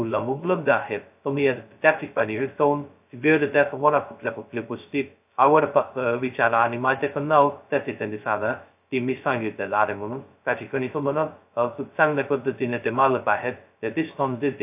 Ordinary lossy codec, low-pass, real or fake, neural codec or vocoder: AAC, 32 kbps; 3.6 kHz; fake; codec, 16 kHz, 0.2 kbps, FocalCodec